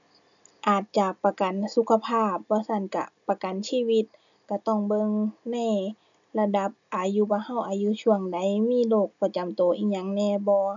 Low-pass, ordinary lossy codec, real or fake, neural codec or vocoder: 7.2 kHz; none; real; none